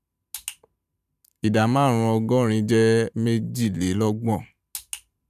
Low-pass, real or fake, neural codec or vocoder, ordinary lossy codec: 14.4 kHz; fake; vocoder, 44.1 kHz, 128 mel bands every 512 samples, BigVGAN v2; none